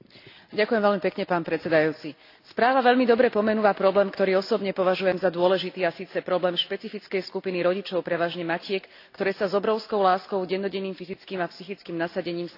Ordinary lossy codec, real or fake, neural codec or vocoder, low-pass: AAC, 32 kbps; real; none; 5.4 kHz